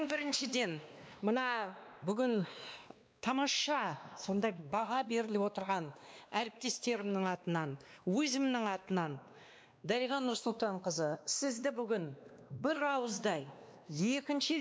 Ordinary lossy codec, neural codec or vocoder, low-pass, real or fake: none; codec, 16 kHz, 2 kbps, X-Codec, WavLM features, trained on Multilingual LibriSpeech; none; fake